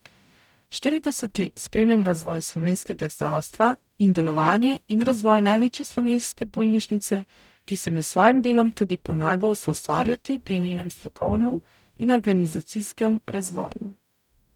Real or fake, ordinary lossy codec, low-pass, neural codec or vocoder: fake; none; 19.8 kHz; codec, 44.1 kHz, 0.9 kbps, DAC